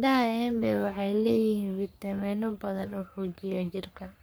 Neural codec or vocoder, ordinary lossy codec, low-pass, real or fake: codec, 44.1 kHz, 3.4 kbps, Pupu-Codec; none; none; fake